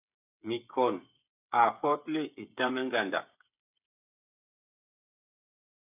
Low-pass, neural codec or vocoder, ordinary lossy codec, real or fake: 3.6 kHz; codec, 16 kHz, 8 kbps, FreqCodec, smaller model; AAC, 32 kbps; fake